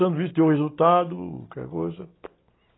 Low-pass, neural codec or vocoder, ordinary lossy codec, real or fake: 7.2 kHz; none; AAC, 16 kbps; real